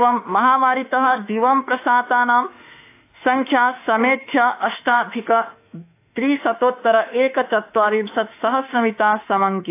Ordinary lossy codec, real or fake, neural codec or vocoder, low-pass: AAC, 24 kbps; fake; autoencoder, 48 kHz, 32 numbers a frame, DAC-VAE, trained on Japanese speech; 3.6 kHz